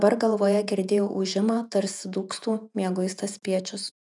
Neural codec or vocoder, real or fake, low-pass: vocoder, 48 kHz, 128 mel bands, Vocos; fake; 10.8 kHz